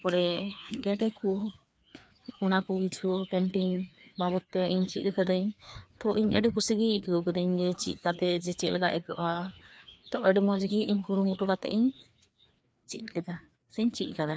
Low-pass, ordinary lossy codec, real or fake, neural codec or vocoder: none; none; fake; codec, 16 kHz, 2 kbps, FreqCodec, larger model